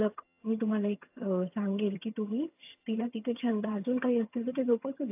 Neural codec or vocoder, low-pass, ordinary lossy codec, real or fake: vocoder, 22.05 kHz, 80 mel bands, HiFi-GAN; 3.6 kHz; AAC, 32 kbps; fake